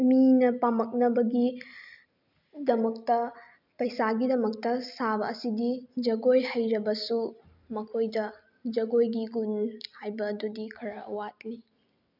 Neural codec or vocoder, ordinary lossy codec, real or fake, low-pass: none; none; real; 5.4 kHz